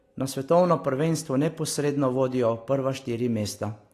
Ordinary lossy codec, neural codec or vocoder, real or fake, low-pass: AAC, 48 kbps; none; real; 14.4 kHz